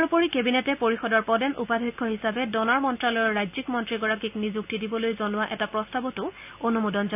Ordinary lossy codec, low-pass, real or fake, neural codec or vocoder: AAC, 32 kbps; 3.6 kHz; real; none